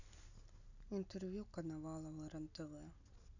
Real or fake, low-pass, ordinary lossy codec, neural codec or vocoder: real; 7.2 kHz; none; none